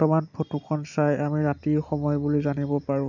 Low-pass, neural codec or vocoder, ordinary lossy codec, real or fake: 7.2 kHz; none; none; real